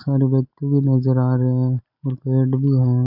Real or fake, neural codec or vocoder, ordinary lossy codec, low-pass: real; none; AAC, 48 kbps; 5.4 kHz